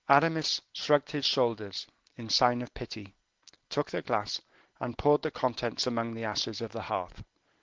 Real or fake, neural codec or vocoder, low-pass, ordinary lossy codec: real; none; 7.2 kHz; Opus, 16 kbps